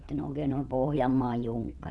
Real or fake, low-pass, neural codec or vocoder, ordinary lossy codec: fake; none; vocoder, 22.05 kHz, 80 mel bands, WaveNeXt; none